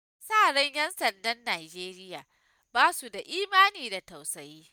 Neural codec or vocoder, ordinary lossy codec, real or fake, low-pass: none; none; real; none